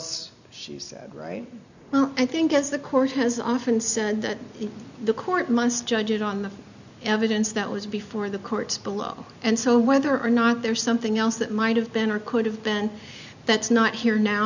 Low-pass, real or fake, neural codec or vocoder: 7.2 kHz; real; none